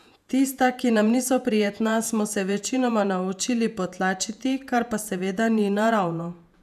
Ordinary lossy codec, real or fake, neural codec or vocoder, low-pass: none; fake; vocoder, 48 kHz, 128 mel bands, Vocos; 14.4 kHz